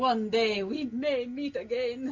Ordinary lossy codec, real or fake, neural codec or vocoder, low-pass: MP3, 64 kbps; real; none; 7.2 kHz